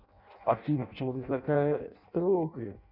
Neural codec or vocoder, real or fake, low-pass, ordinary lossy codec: codec, 16 kHz in and 24 kHz out, 0.6 kbps, FireRedTTS-2 codec; fake; 5.4 kHz; none